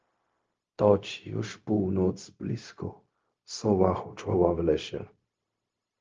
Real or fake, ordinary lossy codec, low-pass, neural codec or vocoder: fake; Opus, 24 kbps; 7.2 kHz; codec, 16 kHz, 0.4 kbps, LongCat-Audio-Codec